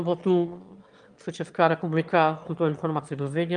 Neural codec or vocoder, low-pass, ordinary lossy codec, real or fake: autoencoder, 22.05 kHz, a latent of 192 numbers a frame, VITS, trained on one speaker; 9.9 kHz; Opus, 32 kbps; fake